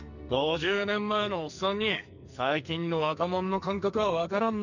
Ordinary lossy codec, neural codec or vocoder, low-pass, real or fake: none; codec, 44.1 kHz, 2.6 kbps, SNAC; 7.2 kHz; fake